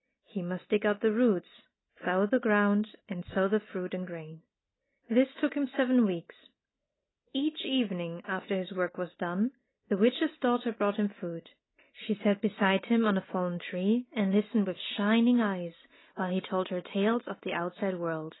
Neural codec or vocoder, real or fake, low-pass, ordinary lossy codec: none; real; 7.2 kHz; AAC, 16 kbps